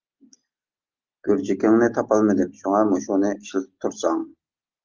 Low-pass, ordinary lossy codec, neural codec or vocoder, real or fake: 7.2 kHz; Opus, 32 kbps; none; real